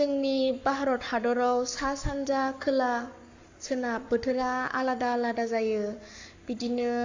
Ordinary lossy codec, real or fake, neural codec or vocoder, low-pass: AAC, 48 kbps; fake; codec, 44.1 kHz, 7.8 kbps, Pupu-Codec; 7.2 kHz